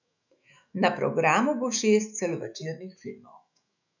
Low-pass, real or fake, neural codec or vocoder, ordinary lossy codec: 7.2 kHz; fake; autoencoder, 48 kHz, 128 numbers a frame, DAC-VAE, trained on Japanese speech; none